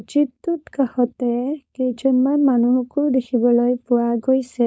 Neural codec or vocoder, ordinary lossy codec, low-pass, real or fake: codec, 16 kHz, 4.8 kbps, FACodec; none; none; fake